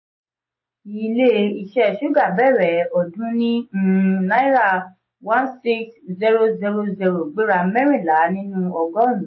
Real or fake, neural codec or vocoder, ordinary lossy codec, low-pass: real; none; MP3, 24 kbps; 7.2 kHz